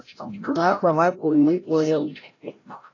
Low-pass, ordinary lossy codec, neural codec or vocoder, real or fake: 7.2 kHz; AAC, 32 kbps; codec, 16 kHz, 0.5 kbps, FreqCodec, larger model; fake